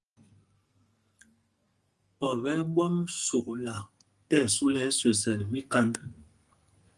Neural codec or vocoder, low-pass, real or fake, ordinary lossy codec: codec, 44.1 kHz, 2.6 kbps, SNAC; 10.8 kHz; fake; Opus, 32 kbps